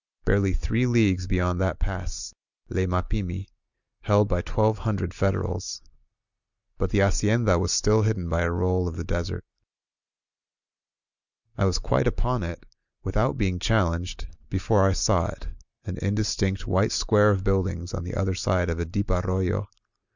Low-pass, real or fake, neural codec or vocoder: 7.2 kHz; real; none